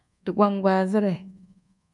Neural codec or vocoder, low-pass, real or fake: codec, 24 kHz, 1.2 kbps, DualCodec; 10.8 kHz; fake